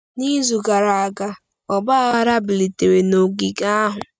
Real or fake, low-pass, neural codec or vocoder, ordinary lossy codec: real; none; none; none